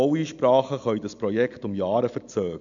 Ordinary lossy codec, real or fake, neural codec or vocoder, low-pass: MP3, 64 kbps; real; none; 7.2 kHz